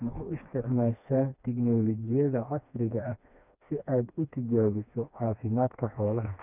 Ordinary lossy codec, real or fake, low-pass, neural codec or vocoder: none; fake; 3.6 kHz; codec, 16 kHz, 2 kbps, FreqCodec, smaller model